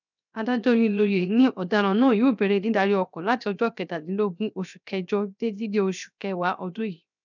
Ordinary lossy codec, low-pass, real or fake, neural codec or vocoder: none; 7.2 kHz; fake; codec, 16 kHz, 0.7 kbps, FocalCodec